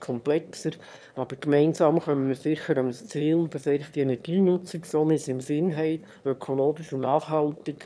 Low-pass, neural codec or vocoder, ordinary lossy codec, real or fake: none; autoencoder, 22.05 kHz, a latent of 192 numbers a frame, VITS, trained on one speaker; none; fake